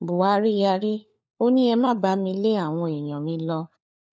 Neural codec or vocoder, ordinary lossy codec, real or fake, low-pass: codec, 16 kHz, 4 kbps, FunCodec, trained on LibriTTS, 50 frames a second; none; fake; none